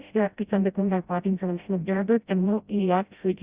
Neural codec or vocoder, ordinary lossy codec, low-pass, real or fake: codec, 16 kHz, 0.5 kbps, FreqCodec, smaller model; Opus, 64 kbps; 3.6 kHz; fake